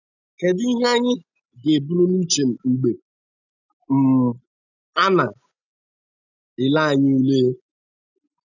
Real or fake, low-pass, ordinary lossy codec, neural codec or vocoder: real; 7.2 kHz; none; none